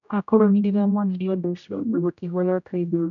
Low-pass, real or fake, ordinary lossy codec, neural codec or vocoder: 7.2 kHz; fake; none; codec, 16 kHz, 0.5 kbps, X-Codec, HuBERT features, trained on general audio